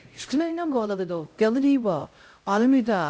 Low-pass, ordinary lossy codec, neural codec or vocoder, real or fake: none; none; codec, 16 kHz, 0.5 kbps, X-Codec, HuBERT features, trained on LibriSpeech; fake